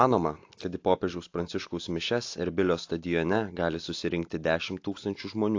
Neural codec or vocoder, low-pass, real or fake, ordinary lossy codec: none; 7.2 kHz; real; AAC, 48 kbps